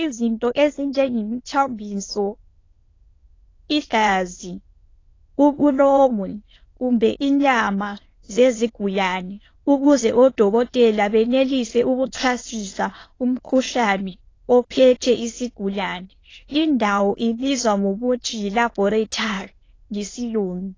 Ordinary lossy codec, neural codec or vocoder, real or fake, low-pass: AAC, 32 kbps; autoencoder, 22.05 kHz, a latent of 192 numbers a frame, VITS, trained on many speakers; fake; 7.2 kHz